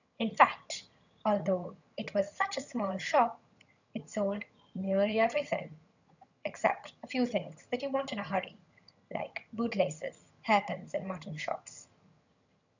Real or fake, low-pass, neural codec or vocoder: fake; 7.2 kHz; vocoder, 22.05 kHz, 80 mel bands, HiFi-GAN